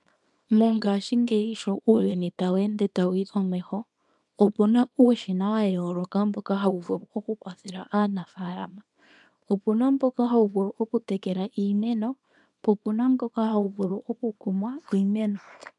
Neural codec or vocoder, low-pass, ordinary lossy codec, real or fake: codec, 24 kHz, 0.9 kbps, WavTokenizer, small release; 10.8 kHz; MP3, 96 kbps; fake